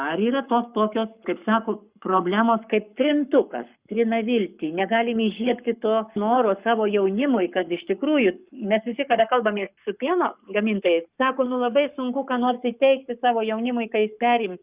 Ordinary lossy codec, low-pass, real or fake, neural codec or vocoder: Opus, 64 kbps; 3.6 kHz; fake; codec, 44.1 kHz, 7.8 kbps, Pupu-Codec